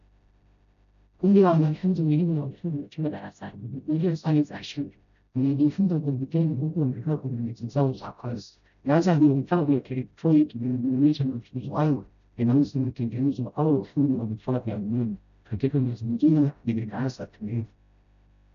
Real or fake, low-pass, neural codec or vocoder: fake; 7.2 kHz; codec, 16 kHz, 0.5 kbps, FreqCodec, smaller model